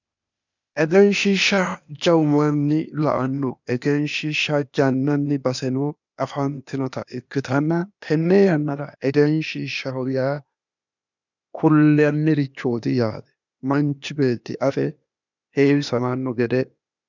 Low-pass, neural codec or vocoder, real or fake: 7.2 kHz; codec, 16 kHz, 0.8 kbps, ZipCodec; fake